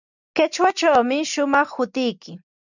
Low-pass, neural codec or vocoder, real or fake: 7.2 kHz; none; real